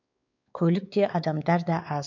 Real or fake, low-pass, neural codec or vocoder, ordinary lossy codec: fake; 7.2 kHz; codec, 16 kHz, 4 kbps, X-Codec, WavLM features, trained on Multilingual LibriSpeech; none